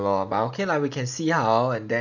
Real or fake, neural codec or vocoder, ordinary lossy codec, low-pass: real; none; none; 7.2 kHz